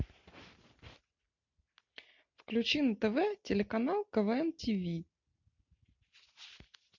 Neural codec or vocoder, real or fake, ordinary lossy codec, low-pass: none; real; MP3, 48 kbps; 7.2 kHz